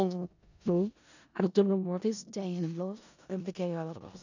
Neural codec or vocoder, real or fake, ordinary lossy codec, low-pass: codec, 16 kHz in and 24 kHz out, 0.4 kbps, LongCat-Audio-Codec, four codebook decoder; fake; none; 7.2 kHz